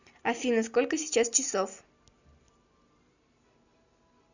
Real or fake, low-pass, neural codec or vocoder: real; 7.2 kHz; none